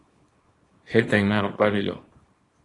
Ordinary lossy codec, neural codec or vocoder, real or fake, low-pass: AAC, 32 kbps; codec, 24 kHz, 0.9 kbps, WavTokenizer, small release; fake; 10.8 kHz